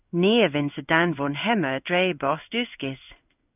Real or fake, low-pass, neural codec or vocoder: fake; 3.6 kHz; codec, 16 kHz in and 24 kHz out, 1 kbps, XY-Tokenizer